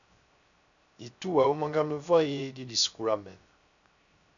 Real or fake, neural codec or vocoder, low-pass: fake; codec, 16 kHz, 0.3 kbps, FocalCodec; 7.2 kHz